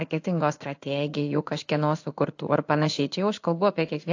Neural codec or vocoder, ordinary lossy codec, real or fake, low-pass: codec, 16 kHz in and 24 kHz out, 1 kbps, XY-Tokenizer; AAC, 48 kbps; fake; 7.2 kHz